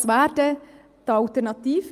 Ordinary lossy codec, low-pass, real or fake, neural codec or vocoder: Opus, 32 kbps; 14.4 kHz; real; none